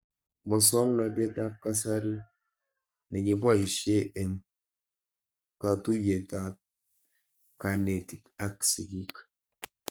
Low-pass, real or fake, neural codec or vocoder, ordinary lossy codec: none; fake; codec, 44.1 kHz, 3.4 kbps, Pupu-Codec; none